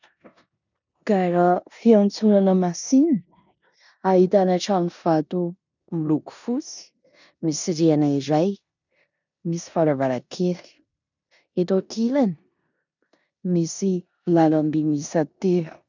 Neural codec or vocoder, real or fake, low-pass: codec, 16 kHz in and 24 kHz out, 0.9 kbps, LongCat-Audio-Codec, four codebook decoder; fake; 7.2 kHz